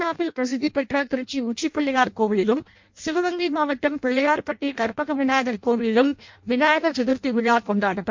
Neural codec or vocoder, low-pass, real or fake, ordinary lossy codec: codec, 16 kHz in and 24 kHz out, 0.6 kbps, FireRedTTS-2 codec; 7.2 kHz; fake; MP3, 48 kbps